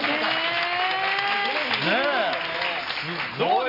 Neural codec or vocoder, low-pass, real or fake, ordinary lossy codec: none; 5.4 kHz; real; AAC, 48 kbps